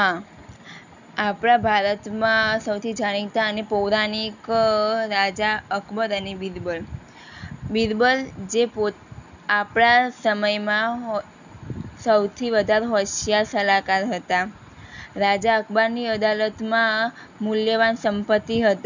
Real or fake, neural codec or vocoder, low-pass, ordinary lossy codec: real; none; 7.2 kHz; none